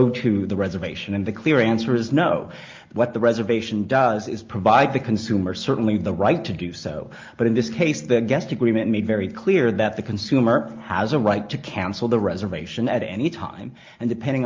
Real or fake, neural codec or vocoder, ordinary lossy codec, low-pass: real; none; Opus, 24 kbps; 7.2 kHz